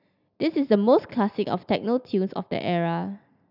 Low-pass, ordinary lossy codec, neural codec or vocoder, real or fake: 5.4 kHz; none; none; real